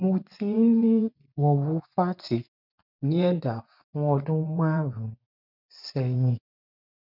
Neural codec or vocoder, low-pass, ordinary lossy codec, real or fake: vocoder, 24 kHz, 100 mel bands, Vocos; 5.4 kHz; none; fake